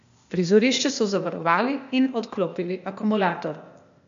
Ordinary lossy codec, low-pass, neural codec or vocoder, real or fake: MP3, 64 kbps; 7.2 kHz; codec, 16 kHz, 0.8 kbps, ZipCodec; fake